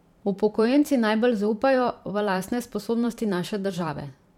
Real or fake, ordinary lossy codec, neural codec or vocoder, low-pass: fake; MP3, 96 kbps; vocoder, 44.1 kHz, 128 mel bands every 512 samples, BigVGAN v2; 19.8 kHz